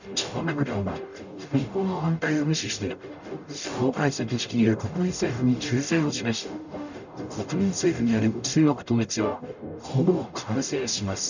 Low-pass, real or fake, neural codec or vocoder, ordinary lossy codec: 7.2 kHz; fake; codec, 44.1 kHz, 0.9 kbps, DAC; none